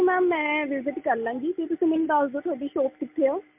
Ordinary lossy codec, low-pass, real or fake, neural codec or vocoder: MP3, 32 kbps; 3.6 kHz; real; none